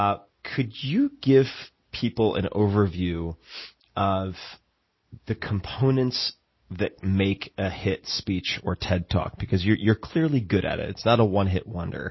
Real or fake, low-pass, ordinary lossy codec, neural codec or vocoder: real; 7.2 kHz; MP3, 24 kbps; none